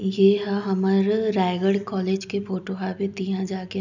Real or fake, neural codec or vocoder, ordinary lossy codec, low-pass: real; none; none; 7.2 kHz